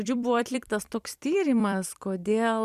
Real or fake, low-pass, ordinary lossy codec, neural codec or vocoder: fake; 14.4 kHz; Opus, 64 kbps; vocoder, 44.1 kHz, 128 mel bands every 256 samples, BigVGAN v2